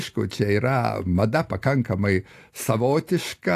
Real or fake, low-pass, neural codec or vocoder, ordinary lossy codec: real; 14.4 kHz; none; AAC, 64 kbps